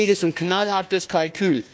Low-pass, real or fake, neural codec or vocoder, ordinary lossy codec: none; fake; codec, 16 kHz, 1 kbps, FunCodec, trained on Chinese and English, 50 frames a second; none